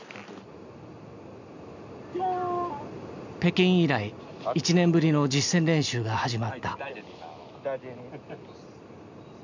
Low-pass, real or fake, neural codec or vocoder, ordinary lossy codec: 7.2 kHz; real; none; none